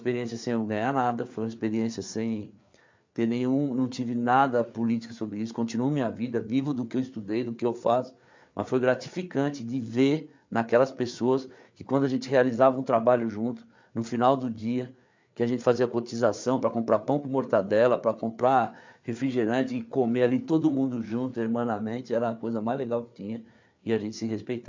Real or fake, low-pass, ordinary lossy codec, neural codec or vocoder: fake; 7.2 kHz; MP3, 64 kbps; codec, 16 kHz, 4 kbps, FreqCodec, larger model